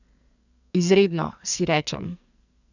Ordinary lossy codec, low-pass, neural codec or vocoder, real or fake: none; 7.2 kHz; codec, 44.1 kHz, 2.6 kbps, SNAC; fake